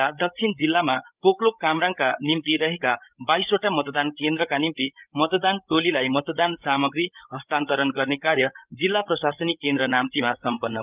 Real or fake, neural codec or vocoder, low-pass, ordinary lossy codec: fake; codec, 16 kHz, 16 kbps, FreqCodec, larger model; 3.6 kHz; Opus, 24 kbps